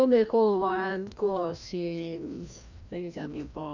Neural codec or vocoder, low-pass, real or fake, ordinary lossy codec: codec, 16 kHz, 1 kbps, FreqCodec, larger model; 7.2 kHz; fake; none